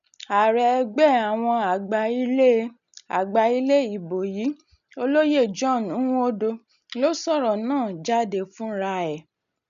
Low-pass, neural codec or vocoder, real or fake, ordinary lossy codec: 7.2 kHz; none; real; none